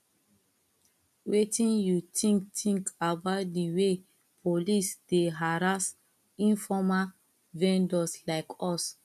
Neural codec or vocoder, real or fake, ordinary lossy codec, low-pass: none; real; none; 14.4 kHz